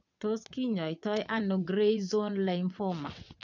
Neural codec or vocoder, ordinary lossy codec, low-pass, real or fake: vocoder, 22.05 kHz, 80 mel bands, WaveNeXt; none; 7.2 kHz; fake